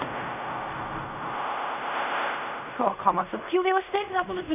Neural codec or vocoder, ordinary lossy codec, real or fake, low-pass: codec, 16 kHz in and 24 kHz out, 0.4 kbps, LongCat-Audio-Codec, fine tuned four codebook decoder; none; fake; 3.6 kHz